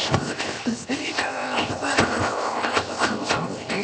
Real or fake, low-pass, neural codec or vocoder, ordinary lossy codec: fake; none; codec, 16 kHz, 0.7 kbps, FocalCodec; none